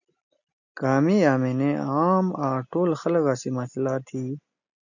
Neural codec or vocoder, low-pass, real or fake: none; 7.2 kHz; real